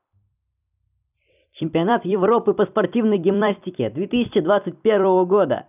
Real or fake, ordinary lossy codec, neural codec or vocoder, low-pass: fake; none; vocoder, 44.1 kHz, 128 mel bands every 256 samples, BigVGAN v2; 3.6 kHz